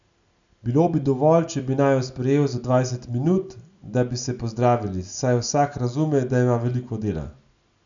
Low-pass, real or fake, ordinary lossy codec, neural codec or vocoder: 7.2 kHz; real; none; none